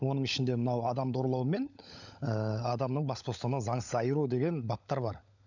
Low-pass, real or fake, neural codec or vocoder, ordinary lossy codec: 7.2 kHz; fake; codec, 16 kHz, 16 kbps, FunCodec, trained on LibriTTS, 50 frames a second; none